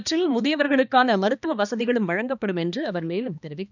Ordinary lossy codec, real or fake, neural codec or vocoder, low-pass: none; fake; codec, 16 kHz, 2 kbps, X-Codec, HuBERT features, trained on balanced general audio; 7.2 kHz